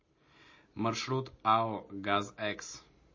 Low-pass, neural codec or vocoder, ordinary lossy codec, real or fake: 7.2 kHz; none; MP3, 32 kbps; real